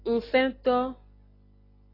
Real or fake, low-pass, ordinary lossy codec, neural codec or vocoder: real; 5.4 kHz; AAC, 24 kbps; none